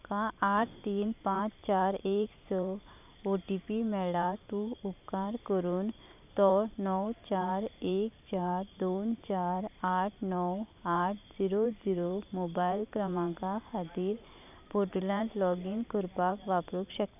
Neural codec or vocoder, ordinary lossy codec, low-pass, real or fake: vocoder, 44.1 kHz, 128 mel bands every 512 samples, BigVGAN v2; none; 3.6 kHz; fake